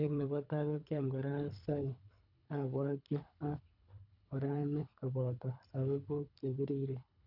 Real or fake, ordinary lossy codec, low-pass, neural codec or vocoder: fake; none; 5.4 kHz; codec, 24 kHz, 3 kbps, HILCodec